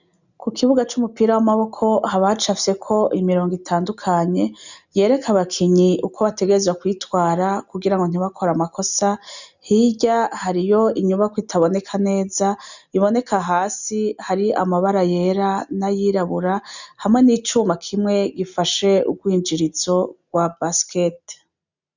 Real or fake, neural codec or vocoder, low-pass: real; none; 7.2 kHz